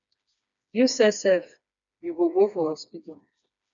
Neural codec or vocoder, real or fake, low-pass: codec, 16 kHz, 2 kbps, FreqCodec, smaller model; fake; 7.2 kHz